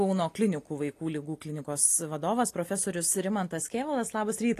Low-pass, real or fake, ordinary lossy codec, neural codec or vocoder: 14.4 kHz; real; AAC, 48 kbps; none